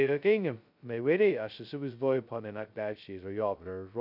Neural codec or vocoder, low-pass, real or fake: codec, 16 kHz, 0.2 kbps, FocalCodec; 5.4 kHz; fake